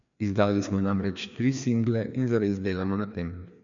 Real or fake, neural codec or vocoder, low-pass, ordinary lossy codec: fake; codec, 16 kHz, 2 kbps, FreqCodec, larger model; 7.2 kHz; none